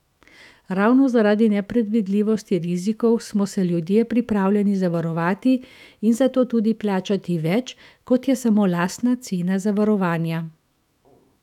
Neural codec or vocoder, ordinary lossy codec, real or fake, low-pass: autoencoder, 48 kHz, 128 numbers a frame, DAC-VAE, trained on Japanese speech; none; fake; 19.8 kHz